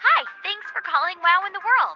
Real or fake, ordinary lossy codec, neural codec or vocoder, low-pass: real; Opus, 16 kbps; none; 7.2 kHz